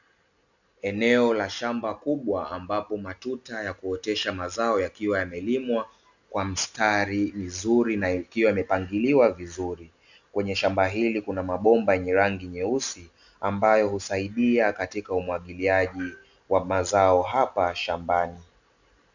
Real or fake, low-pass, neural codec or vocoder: real; 7.2 kHz; none